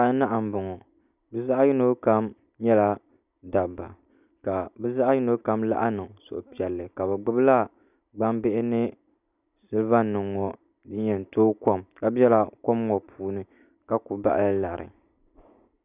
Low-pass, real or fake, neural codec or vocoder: 3.6 kHz; real; none